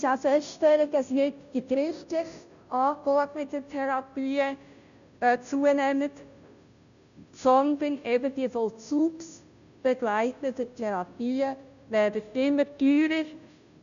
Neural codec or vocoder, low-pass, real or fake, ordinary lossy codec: codec, 16 kHz, 0.5 kbps, FunCodec, trained on Chinese and English, 25 frames a second; 7.2 kHz; fake; none